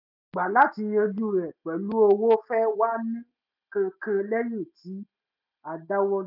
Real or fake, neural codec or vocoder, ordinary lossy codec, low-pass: real; none; none; 5.4 kHz